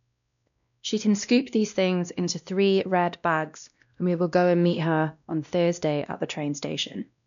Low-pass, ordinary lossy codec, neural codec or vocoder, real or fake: 7.2 kHz; none; codec, 16 kHz, 1 kbps, X-Codec, WavLM features, trained on Multilingual LibriSpeech; fake